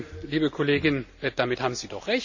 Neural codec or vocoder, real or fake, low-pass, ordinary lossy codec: none; real; 7.2 kHz; none